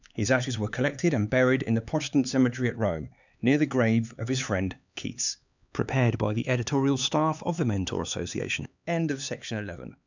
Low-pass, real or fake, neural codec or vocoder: 7.2 kHz; fake; codec, 16 kHz, 4 kbps, X-Codec, HuBERT features, trained on LibriSpeech